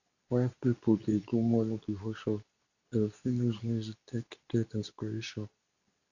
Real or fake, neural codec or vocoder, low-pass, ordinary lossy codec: fake; codec, 24 kHz, 0.9 kbps, WavTokenizer, medium speech release version 2; 7.2 kHz; none